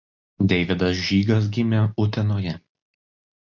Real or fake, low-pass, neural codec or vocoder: real; 7.2 kHz; none